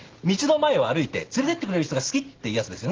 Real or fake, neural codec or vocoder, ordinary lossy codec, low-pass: real; none; Opus, 16 kbps; 7.2 kHz